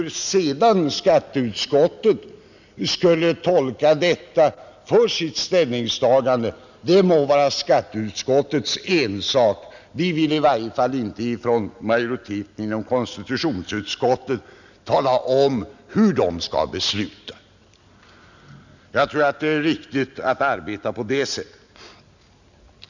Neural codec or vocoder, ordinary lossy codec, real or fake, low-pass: none; none; real; 7.2 kHz